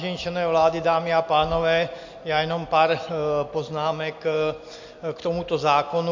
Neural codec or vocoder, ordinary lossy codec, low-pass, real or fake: none; MP3, 48 kbps; 7.2 kHz; real